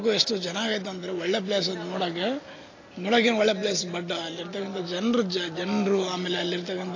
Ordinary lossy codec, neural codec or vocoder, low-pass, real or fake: AAC, 32 kbps; none; 7.2 kHz; real